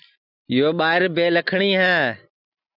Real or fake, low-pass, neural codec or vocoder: real; 5.4 kHz; none